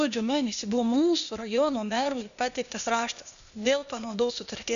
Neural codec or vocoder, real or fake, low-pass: codec, 16 kHz, 0.8 kbps, ZipCodec; fake; 7.2 kHz